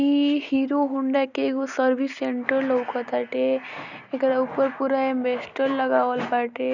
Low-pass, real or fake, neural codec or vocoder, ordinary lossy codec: 7.2 kHz; real; none; none